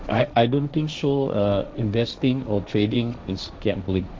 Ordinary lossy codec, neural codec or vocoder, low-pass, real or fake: none; codec, 16 kHz, 1.1 kbps, Voila-Tokenizer; none; fake